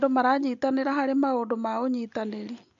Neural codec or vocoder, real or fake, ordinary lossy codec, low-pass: none; real; MP3, 64 kbps; 7.2 kHz